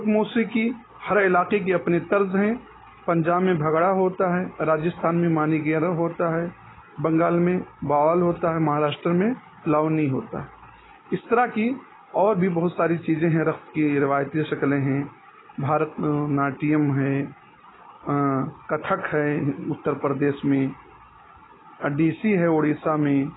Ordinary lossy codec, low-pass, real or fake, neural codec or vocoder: AAC, 16 kbps; 7.2 kHz; real; none